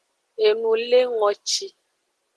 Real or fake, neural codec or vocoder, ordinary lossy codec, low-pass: real; none; Opus, 16 kbps; 10.8 kHz